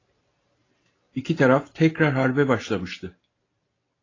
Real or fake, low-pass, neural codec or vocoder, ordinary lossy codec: real; 7.2 kHz; none; AAC, 32 kbps